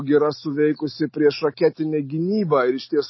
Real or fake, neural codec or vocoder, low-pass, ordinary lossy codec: real; none; 7.2 kHz; MP3, 24 kbps